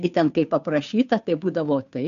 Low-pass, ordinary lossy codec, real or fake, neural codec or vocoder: 7.2 kHz; AAC, 96 kbps; fake; codec, 16 kHz, 2 kbps, FunCodec, trained on Chinese and English, 25 frames a second